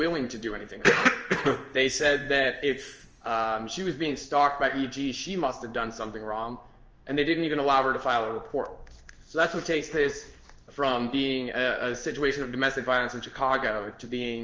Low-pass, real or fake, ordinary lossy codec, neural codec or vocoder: 7.2 kHz; fake; Opus, 24 kbps; codec, 16 kHz in and 24 kHz out, 1 kbps, XY-Tokenizer